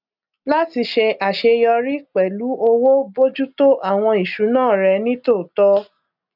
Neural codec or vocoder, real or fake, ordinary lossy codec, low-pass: none; real; none; 5.4 kHz